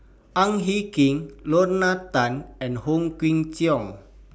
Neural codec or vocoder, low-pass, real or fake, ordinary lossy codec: none; none; real; none